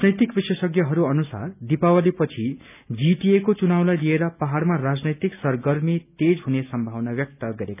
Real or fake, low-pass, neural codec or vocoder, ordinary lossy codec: real; 3.6 kHz; none; none